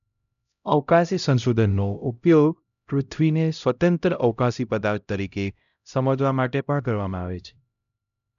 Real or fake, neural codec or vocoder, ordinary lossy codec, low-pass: fake; codec, 16 kHz, 0.5 kbps, X-Codec, HuBERT features, trained on LibriSpeech; none; 7.2 kHz